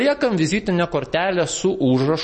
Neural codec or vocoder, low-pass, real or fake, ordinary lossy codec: none; 10.8 kHz; real; MP3, 32 kbps